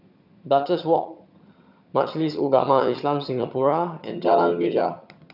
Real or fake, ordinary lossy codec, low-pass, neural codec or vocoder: fake; none; 5.4 kHz; vocoder, 22.05 kHz, 80 mel bands, HiFi-GAN